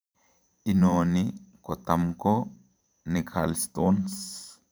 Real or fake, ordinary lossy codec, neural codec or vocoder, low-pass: fake; none; vocoder, 44.1 kHz, 128 mel bands every 512 samples, BigVGAN v2; none